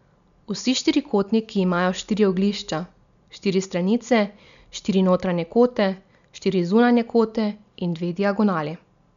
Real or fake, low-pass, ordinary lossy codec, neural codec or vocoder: real; 7.2 kHz; none; none